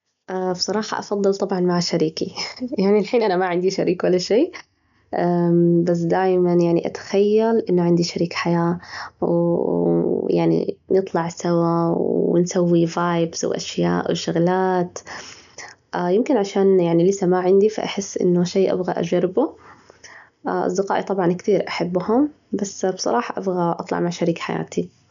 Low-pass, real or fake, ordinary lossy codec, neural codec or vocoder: 7.2 kHz; real; none; none